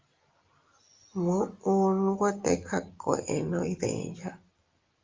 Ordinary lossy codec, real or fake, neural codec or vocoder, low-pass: Opus, 32 kbps; real; none; 7.2 kHz